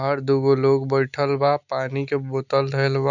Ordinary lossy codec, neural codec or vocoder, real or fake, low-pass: none; none; real; 7.2 kHz